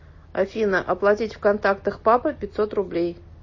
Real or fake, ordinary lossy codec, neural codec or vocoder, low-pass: real; MP3, 32 kbps; none; 7.2 kHz